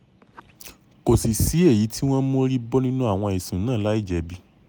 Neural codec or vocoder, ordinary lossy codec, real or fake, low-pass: none; none; real; none